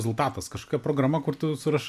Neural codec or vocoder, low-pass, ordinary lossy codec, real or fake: none; 14.4 kHz; MP3, 96 kbps; real